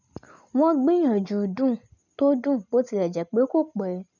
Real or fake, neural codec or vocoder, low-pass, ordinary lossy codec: real; none; 7.2 kHz; none